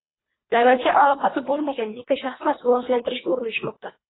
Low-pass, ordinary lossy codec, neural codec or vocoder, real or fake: 7.2 kHz; AAC, 16 kbps; codec, 24 kHz, 1.5 kbps, HILCodec; fake